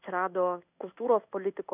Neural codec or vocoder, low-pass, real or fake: codec, 16 kHz in and 24 kHz out, 1 kbps, XY-Tokenizer; 3.6 kHz; fake